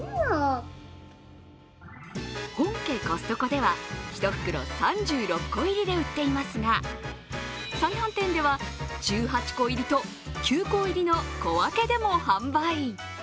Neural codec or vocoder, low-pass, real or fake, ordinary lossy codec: none; none; real; none